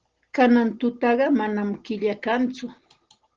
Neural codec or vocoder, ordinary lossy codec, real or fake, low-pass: none; Opus, 16 kbps; real; 7.2 kHz